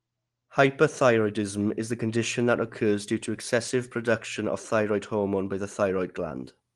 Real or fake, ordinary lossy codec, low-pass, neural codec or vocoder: real; Opus, 24 kbps; 10.8 kHz; none